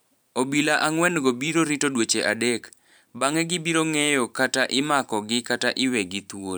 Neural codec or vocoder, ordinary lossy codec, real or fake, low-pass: vocoder, 44.1 kHz, 128 mel bands every 512 samples, BigVGAN v2; none; fake; none